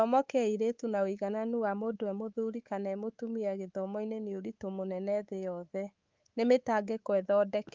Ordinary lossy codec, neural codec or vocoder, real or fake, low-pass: Opus, 32 kbps; codec, 24 kHz, 3.1 kbps, DualCodec; fake; 7.2 kHz